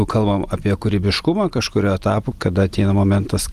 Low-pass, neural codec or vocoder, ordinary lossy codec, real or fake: 14.4 kHz; none; Opus, 24 kbps; real